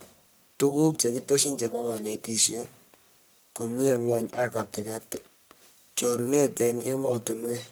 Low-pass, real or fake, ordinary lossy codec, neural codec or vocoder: none; fake; none; codec, 44.1 kHz, 1.7 kbps, Pupu-Codec